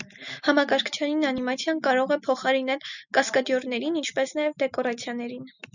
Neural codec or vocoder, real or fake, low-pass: none; real; 7.2 kHz